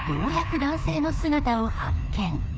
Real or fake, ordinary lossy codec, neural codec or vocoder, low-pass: fake; none; codec, 16 kHz, 2 kbps, FreqCodec, larger model; none